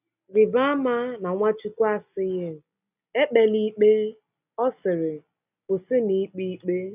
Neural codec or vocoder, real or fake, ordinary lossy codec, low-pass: none; real; none; 3.6 kHz